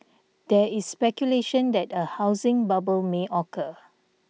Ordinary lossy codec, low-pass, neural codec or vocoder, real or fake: none; none; none; real